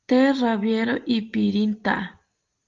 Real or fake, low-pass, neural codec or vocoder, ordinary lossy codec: real; 7.2 kHz; none; Opus, 32 kbps